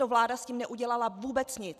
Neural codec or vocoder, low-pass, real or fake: vocoder, 44.1 kHz, 128 mel bands every 256 samples, BigVGAN v2; 14.4 kHz; fake